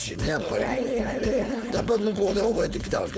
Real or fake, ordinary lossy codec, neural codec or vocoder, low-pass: fake; none; codec, 16 kHz, 4.8 kbps, FACodec; none